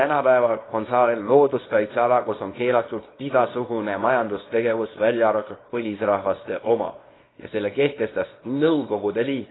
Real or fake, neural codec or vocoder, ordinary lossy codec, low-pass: fake; codec, 24 kHz, 0.9 kbps, WavTokenizer, small release; AAC, 16 kbps; 7.2 kHz